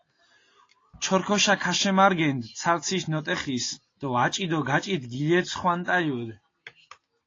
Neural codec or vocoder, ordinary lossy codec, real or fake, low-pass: none; AAC, 32 kbps; real; 7.2 kHz